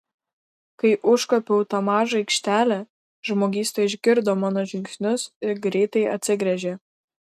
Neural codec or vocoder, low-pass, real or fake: none; 14.4 kHz; real